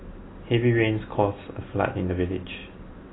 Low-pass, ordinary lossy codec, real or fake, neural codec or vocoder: 7.2 kHz; AAC, 16 kbps; real; none